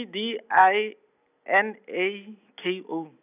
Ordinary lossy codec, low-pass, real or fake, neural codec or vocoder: none; 3.6 kHz; real; none